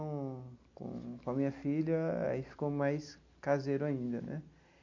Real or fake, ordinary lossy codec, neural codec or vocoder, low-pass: real; MP3, 48 kbps; none; 7.2 kHz